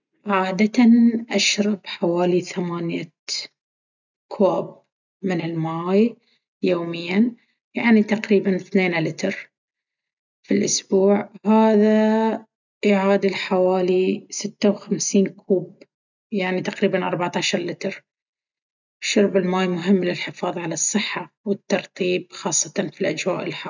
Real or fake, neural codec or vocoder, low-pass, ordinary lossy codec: real; none; 7.2 kHz; none